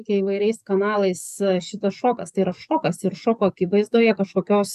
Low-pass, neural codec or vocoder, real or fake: 14.4 kHz; codec, 44.1 kHz, 7.8 kbps, DAC; fake